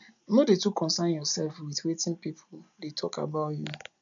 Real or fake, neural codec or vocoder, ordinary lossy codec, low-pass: real; none; none; 7.2 kHz